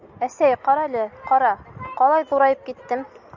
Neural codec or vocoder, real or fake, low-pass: none; real; 7.2 kHz